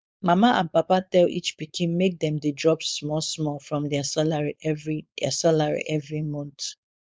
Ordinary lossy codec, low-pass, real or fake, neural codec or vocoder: none; none; fake; codec, 16 kHz, 4.8 kbps, FACodec